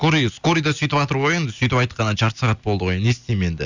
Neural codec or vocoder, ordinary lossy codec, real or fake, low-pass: none; Opus, 64 kbps; real; 7.2 kHz